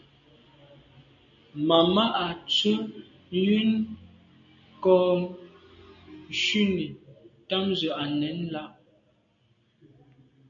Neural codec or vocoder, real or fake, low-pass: none; real; 7.2 kHz